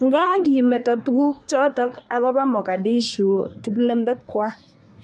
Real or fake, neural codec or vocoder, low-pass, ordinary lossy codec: fake; codec, 24 kHz, 1 kbps, SNAC; none; none